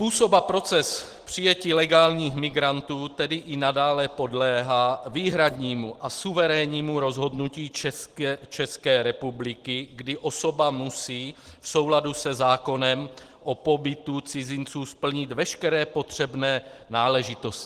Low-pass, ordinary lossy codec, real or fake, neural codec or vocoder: 14.4 kHz; Opus, 16 kbps; real; none